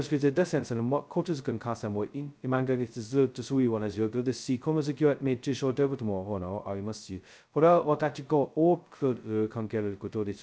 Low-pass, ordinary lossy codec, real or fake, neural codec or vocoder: none; none; fake; codec, 16 kHz, 0.2 kbps, FocalCodec